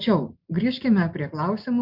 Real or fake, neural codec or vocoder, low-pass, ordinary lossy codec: real; none; 5.4 kHz; MP3, 48 kbps